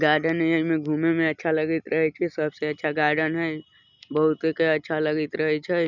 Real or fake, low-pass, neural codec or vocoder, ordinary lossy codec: real; 7.2 kHz; none; none